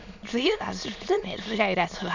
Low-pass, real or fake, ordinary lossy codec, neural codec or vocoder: 7.2 kHz; fake; none; autoencoder, 22.05 kHz, a latent of 192 numbers a frame, VITS, trained on many speakers